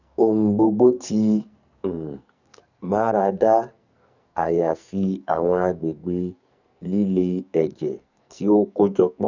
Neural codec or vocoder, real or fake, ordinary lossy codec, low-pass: codec, 44.1 kHz, 2.6 kbps, SNAC; fake; none; 7.2 kHz